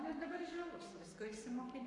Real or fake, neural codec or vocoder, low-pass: fake; codec, 44.1 kHz, 7.8 kbps, Pupu-Codec; 10.8 kHz